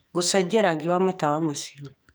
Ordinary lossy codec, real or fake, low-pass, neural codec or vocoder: none; fake; none; codec, 44.1 kHz, 2.6 kbps, SNAC